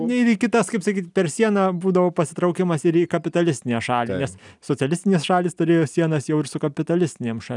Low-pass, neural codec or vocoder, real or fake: 10.8 kHz; none; real